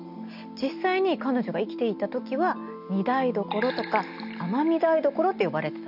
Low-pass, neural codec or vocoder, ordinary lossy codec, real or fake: 5.4 kHz; none; none; real